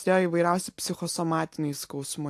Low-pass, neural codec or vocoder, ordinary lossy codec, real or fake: 14.4 kHz; none; AAC, 64 kbps; real